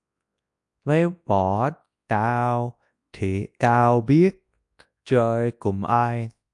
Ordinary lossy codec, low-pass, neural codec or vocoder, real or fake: AAC, 64 kbps; 10.8 kHz; codec, 24 kHz, 0.9 kbps, WavTokenizer, large speech release; fake